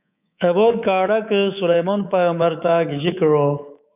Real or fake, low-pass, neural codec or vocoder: fake; 3.6 kHz; codec, 24 kHz, 3.1 kbps, DualCodec